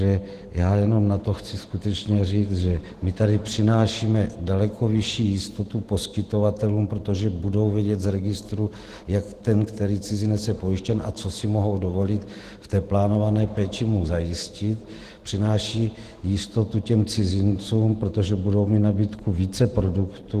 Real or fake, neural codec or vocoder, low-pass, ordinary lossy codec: fake; autoencoder, 48 kHz, 128 numbers a frame, DAC-VAE, trained on Japanese speech; 14.4 kHz; Opus, 16 kbps